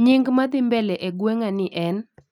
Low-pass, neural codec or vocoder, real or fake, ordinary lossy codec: 19.8 kHz; none; real; none